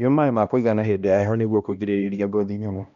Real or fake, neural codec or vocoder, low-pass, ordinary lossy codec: fake; codec, 16 kHz, 1 kbps, X-Codec, HuBERT features, trained on balanced general audio; 7.2 kHz; none